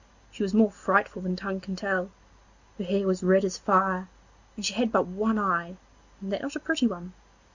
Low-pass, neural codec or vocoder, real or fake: 7.2 kHz; none; real